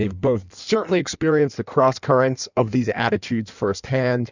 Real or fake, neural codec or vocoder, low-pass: fake; codec, 16 kHz in and 24 kHz out, 1.1 kbps, FireRedTTS-2 codec; 7.2 kHz